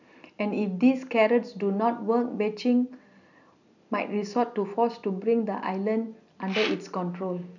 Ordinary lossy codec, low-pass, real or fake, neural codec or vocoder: none; 7.2 kHz; real; none